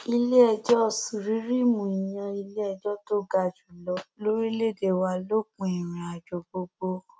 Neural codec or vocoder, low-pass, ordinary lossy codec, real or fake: none; none; none; real